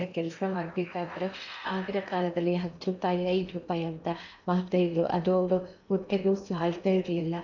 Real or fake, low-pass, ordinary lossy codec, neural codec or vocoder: fake; 7.2 kHz; none; codec, 16 kHz in and 24 kHz out, 0.8 kbps, FocalCodec, streaming, 65536 codes